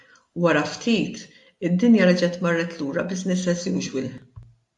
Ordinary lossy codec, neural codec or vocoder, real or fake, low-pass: AAC, 64 kbps; none; real; 10.8 kHz